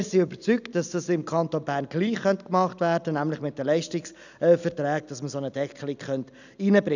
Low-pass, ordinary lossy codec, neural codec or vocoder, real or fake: 7.2 kHz; none; none; real